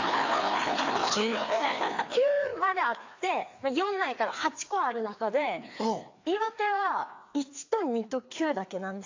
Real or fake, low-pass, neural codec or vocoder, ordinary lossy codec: fake; 7.2 kHz; codec, 16 kHz, 2 kbps, FreqCodec, larger model; AAC, 48 kbps